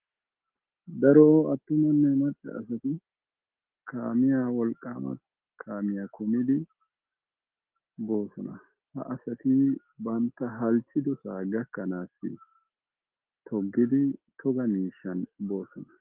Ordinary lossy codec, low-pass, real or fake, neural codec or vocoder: Opus, 16 kbps; 3.6 kHz; real; none